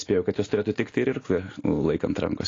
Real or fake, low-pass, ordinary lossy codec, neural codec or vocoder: real; 7.2 kHz; AAC, 32 kbps; none